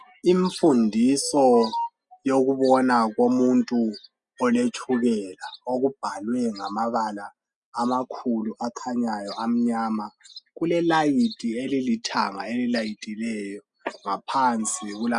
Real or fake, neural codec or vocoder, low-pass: real; none; 10.8 kHz